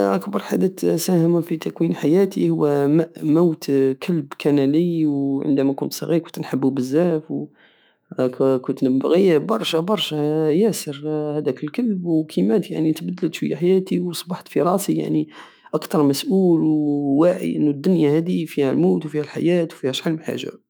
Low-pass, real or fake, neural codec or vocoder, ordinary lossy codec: none; fake; autoencoder, 48 kHz, 128 numbers a frame, DAC-VAE, trained on Japanese speech; none